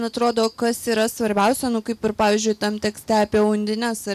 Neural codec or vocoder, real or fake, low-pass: none; real; 14.4 kHz